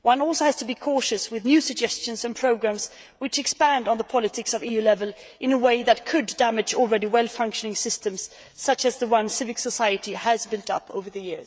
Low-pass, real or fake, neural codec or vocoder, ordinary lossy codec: none; fake; codec, 16 kHz, 16 kbps, FreqCodec, smaller model; none